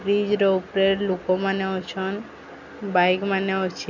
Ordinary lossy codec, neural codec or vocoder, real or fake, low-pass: none; none; real; 7.2 kHz